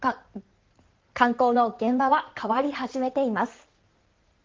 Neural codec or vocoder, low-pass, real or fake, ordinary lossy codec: codec, 16 kHz in and 24 kHz out, 2.2 kbps, FireRedTTS-2 codec; 7.2 kHz; fake; Opus, 16 kbps